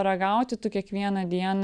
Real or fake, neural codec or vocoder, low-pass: real; none; 9.9 kHz